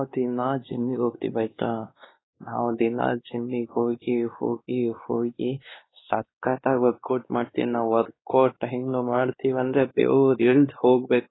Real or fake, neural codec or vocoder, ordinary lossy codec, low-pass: fake; codec, 16 kHz, 2 kbps, X-Codec, WavLM features, trained on Multilingual LibriSpeech; AAC, 16 kbps; 7.2 kHz